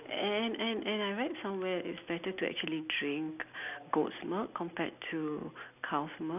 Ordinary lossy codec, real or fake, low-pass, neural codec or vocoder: none; real; 3.6 kHz; none